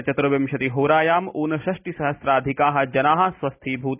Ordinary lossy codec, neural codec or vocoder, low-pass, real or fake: none; none; 3.6 kHz; real